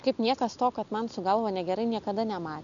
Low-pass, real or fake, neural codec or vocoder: 7.2 kHz; real; none